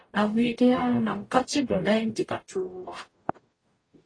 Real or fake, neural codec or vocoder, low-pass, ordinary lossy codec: fake; codec, 44.1 kHz, 0.9 kbps, DAC; 9.9 kHz; AAC, 32 kbps